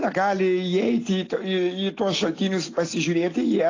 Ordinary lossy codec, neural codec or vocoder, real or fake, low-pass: AAC, 32 kbps; none; real; 7.2 kHz